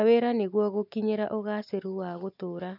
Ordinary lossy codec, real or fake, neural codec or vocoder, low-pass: none; real; none; 5.4 kHz